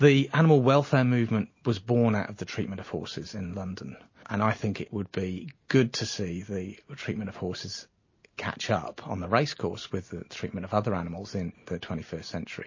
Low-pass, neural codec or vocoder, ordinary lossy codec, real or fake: 7.2 kHz; none; MP3, 32 kbps; real